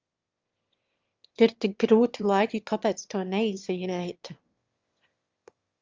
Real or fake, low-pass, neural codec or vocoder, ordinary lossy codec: fake; 7.2 kHz; autoencoder, 22.05 kHz, a latent of 192 numbers a frame, VITS, trained on one speaker; Opus, 32 kbps